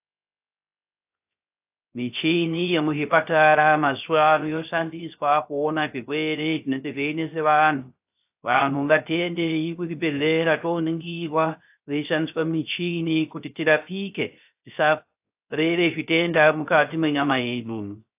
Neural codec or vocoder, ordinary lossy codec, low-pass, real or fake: codec, 16 kHz, 0.3 kbps, FocalCodec; AAC, 32 kbps; 3.6 kHz; fake